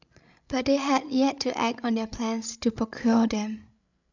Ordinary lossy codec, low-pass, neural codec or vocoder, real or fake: none; 7.2 kHz; codec, 16 kHz, 16 kbps, FreqCodec, larger model; fake